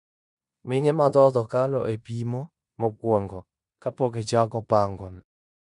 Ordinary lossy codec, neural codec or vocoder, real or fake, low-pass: none; codec, 16 kHz in and 24 kHz out, 0.9 kbps, LongCat-Audio-Codec, four codebook decoder; fake; 10.8 kHz